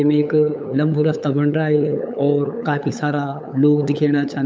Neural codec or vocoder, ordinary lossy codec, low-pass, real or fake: codec, 16 kHz, 8 kbps, FunCodec, trained on LibriTTS, 25 frames a second; none; none; fake